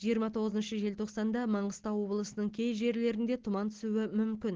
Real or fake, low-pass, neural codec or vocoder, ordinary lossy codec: real; 7.2 kHz; none; Opus, 16 kbps